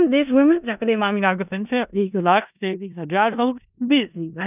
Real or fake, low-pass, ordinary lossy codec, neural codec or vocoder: fake; 3.6 kHz; none; codec, 16 kHz in and 24 kHz out, 0.4 kbps, LongCat-Audio-Codec, four codebook decoder